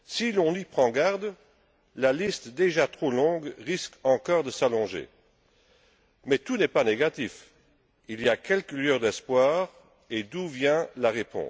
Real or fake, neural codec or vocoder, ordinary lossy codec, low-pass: real; none; none; none